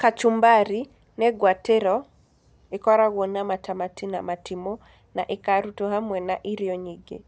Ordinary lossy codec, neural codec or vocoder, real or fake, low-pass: none; none; real; none